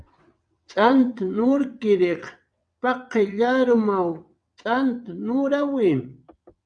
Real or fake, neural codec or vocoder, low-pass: fake; vocoder, 22.05 kHz, 80 mel bands, WaveNeXt; 9.9 kHz